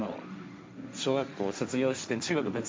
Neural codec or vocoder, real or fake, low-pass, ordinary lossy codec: codec, 16 kHz, 1.1 kbps, Voila-Tokenizer; fake; none; none